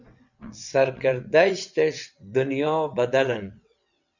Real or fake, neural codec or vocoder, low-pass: fake; vocoder, 22.05 kHz, 80 mel bands, WaveNeXt; 7.2 kHz